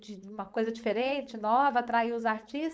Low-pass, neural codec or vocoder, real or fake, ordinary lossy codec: none; codec, 16 kHz, 4.8 kbps, FACodec; fake; none